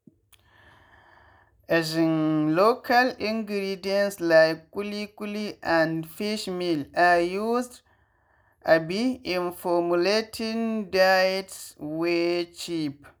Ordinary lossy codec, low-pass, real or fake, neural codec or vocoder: none; none; real; none